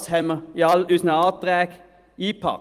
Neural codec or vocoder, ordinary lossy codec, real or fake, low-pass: vocoder, 44.1 kHz, 128 mel bands every 256 samples, BigVGAN v2; Opus, 32 kbps; fake; 14.4 kHz